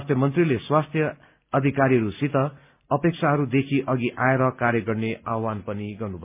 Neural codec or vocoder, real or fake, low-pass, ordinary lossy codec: none; real; 3.6 kHz; MP3, 32 kbps